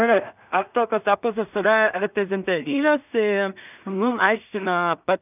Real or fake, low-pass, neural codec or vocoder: fake; 3.6 kHz; codec, 16 kHz in and 24 kHz out, 0.4 kbps, LongCat-Audio-Codec, two codebook decoder